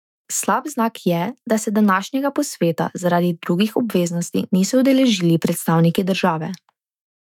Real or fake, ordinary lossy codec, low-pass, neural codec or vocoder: fake; none; 19.8 kHz; autoencoder, 48 kHz, 128 numbers a frame, DAC-VAE, trained on Japanese speech